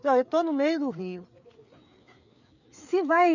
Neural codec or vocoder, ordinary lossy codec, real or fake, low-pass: codec, 16 kHz, 4 kbps, FreqCodec, larger model; none; fake; 7.2 kHz